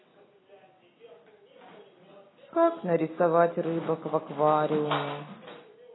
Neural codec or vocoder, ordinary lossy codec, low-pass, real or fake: none; AAC, 16 kbps; 7.2 kHz; real